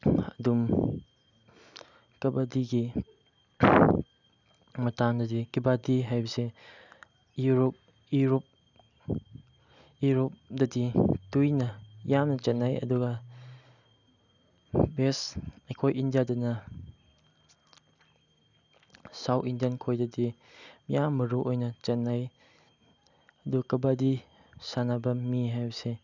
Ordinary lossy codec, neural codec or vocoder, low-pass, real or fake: none; none; 7.2 kHz; real